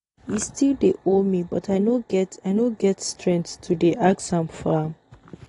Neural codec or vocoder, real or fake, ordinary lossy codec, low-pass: none; real; AAC, 32 kbps; 10.8 kHz